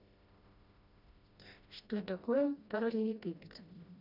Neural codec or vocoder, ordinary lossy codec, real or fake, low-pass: codec, 16 kHz, 1 kbps, FreqCodec, smaller model; none; fake; 5.4 kHz